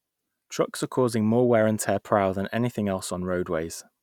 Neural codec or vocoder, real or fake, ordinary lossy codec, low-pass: none; real; none; 19.8 kHz